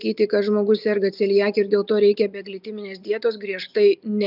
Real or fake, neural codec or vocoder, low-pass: real; none; 5.4 kHz